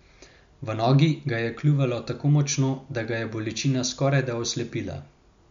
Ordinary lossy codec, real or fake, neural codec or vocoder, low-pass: MP3, 64 kbps; real; none; 7.2 kHz